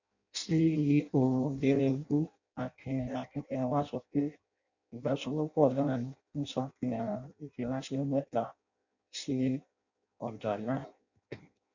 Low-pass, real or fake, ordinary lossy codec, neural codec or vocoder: 7.2 kHz; fake; none; codec, 16 kHz in and 24 kHz out, 0.6 kbps, FireRedTTS-2 codec